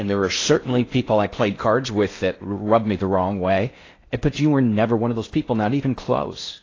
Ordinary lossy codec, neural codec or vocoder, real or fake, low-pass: AAC, 32 kbps; codec, 16 kHz in and 24 kHz out, 0.6 kbps, FocalCodec, streaming, 4096 codes; fake; 7.2 kHz